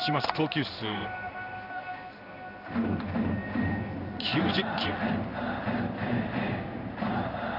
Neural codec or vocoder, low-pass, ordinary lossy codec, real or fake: codec, 16 kHz in and 24 kHz out, 1 kbps, XY-Tokenizer; 5.4 kHz; none; fake